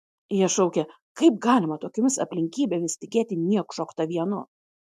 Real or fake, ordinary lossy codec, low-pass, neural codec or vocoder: real; MP3, 64 kbps; 14.4 kHz; none